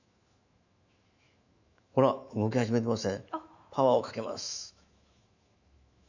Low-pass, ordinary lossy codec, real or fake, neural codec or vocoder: 7.2 kHz; none; fake; autoencoder, 48 kHz, 128 numbers a frame, DAC-VAE, trained on Japanese speech